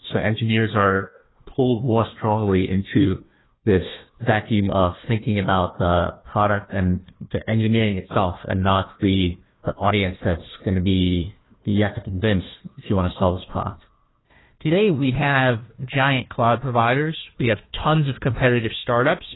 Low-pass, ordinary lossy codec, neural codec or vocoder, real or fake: 7.2 kHz; AAC, 16 kbps; codec, 16 kHz, 1 kbps, FunCodec, trained on Chinese and English, 50 frames a second; fake